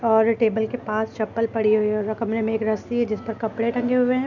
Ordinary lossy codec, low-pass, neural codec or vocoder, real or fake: none; 7.2 kHz; none; real